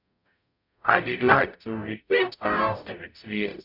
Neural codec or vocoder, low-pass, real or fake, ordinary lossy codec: codec, 44.1 kHz, 0.9 kbps, DAC; 5.4 kHz; fake; none